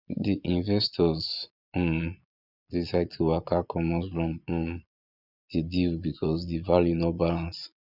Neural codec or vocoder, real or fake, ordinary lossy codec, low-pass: vocoder, 22.05 kHz, 80 mel bands, Vocos; fake; none; 5.4 kHz